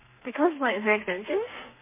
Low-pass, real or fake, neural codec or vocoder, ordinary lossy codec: 3.6 kHz; fake; codec, 32 kHz, 1.9 kbps, SNAC; MP3, 24 kbps